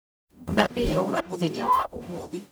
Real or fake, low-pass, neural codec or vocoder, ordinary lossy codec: fake; none; codec, 44.1 kHz, 0.9 kbps, DAC; none